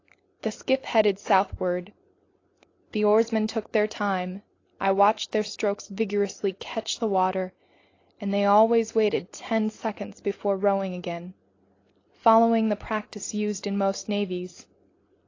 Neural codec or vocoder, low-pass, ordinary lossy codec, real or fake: none; 7.2 kHz; AAC, 32 kbps; real